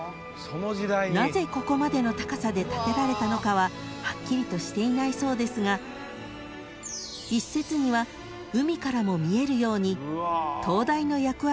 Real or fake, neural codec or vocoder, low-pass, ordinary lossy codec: real; none; none; none